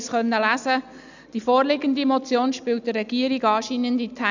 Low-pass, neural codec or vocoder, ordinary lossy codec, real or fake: 7.2 kHz; none; none; real